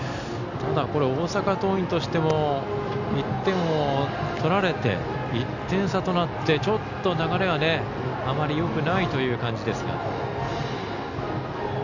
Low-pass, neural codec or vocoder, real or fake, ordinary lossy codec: 7.2 kHz; none; real; none